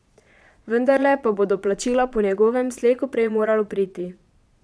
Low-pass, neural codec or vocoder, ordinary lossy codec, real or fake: none; vocoder, 22.05 kHz, 80 mel bands, Vocos; none; fake